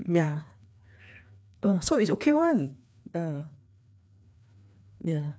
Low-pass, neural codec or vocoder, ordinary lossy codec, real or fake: none; codec, 16 kHz, 2 kbps, FreqCodec, larger model; none; fake